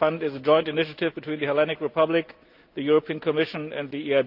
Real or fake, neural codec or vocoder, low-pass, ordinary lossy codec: real; none; 5.4 kHz; Opus, 24 kbps